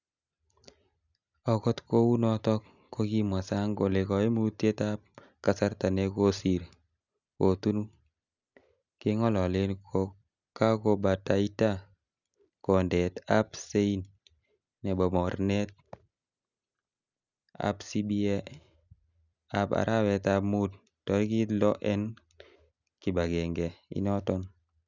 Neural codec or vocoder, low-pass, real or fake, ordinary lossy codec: none; 7.2 kHz; real; none